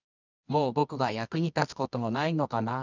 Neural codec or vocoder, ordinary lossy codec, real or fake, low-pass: codec, 24 kHz, 0.9 kbps, WavTokenizer, medium music audio release; none; fake; 7.2 kHz